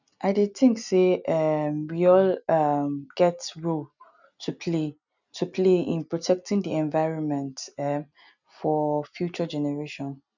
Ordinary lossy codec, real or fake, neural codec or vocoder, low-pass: none; real; none; 7.2 kHz